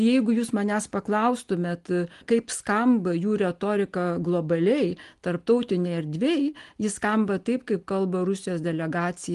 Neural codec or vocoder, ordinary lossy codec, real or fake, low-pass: none; Opus, 24 kbps; real; 10.8 kHz